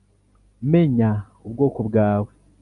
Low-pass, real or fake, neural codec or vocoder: 10.8 kHz; real; none